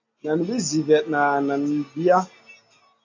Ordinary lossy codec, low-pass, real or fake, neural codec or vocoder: AAC, 48 kbps; 7.2 kHz; real; none